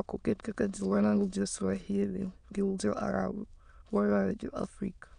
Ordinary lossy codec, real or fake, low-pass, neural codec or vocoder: none; fake; 9.9 kHz; autoencoder, 22.05 kHz, a latent of 192 numbers a frame, VITS, trained on many speakers